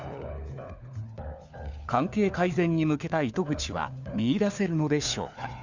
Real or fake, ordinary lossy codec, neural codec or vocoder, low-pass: fake; none; codec, 16 kHz, 4 kbps, FunCodec, trained on LibriTTS, 50 frames a second; 7.2 kHz